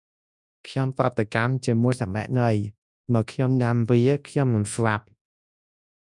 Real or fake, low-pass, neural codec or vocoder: fake; 10.8 kHz; codec, 24 kHz, 0.9 kbps, WavTokenizer, large speech release